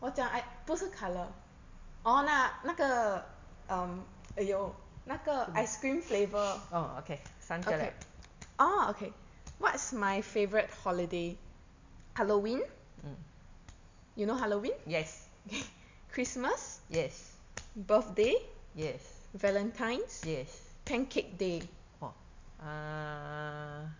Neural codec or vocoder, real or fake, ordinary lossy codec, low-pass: none; real; MP3, 64 kbps; 7.2 kHz